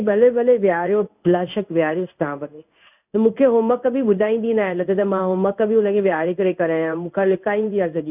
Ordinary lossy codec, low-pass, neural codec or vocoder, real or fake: none; 3.6 kHz; codec, 16 kHz in and 24 kHz out, 1 kbps, XY-Tokenizer; fake